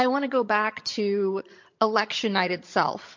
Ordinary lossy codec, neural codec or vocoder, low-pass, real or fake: MP3, 48 kbps; vocoder, 22.05 kHz, 80 mel bands, HiFi-GAN; 7.2 kHz; fake